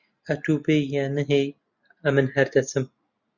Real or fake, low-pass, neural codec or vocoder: real; 7.2 kHz; none